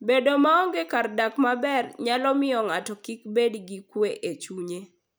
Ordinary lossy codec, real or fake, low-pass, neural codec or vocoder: none; real; none; none